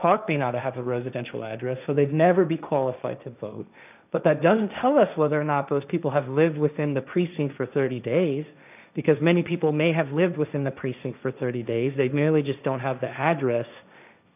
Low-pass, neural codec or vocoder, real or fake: 3.6 kHz; codec, 16 kHz, 1.1 kbps, Voila-Tokenizer; fake